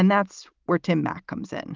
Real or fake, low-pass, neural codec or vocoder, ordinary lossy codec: real; 7.2 kHz; none; Opus, 32 kbps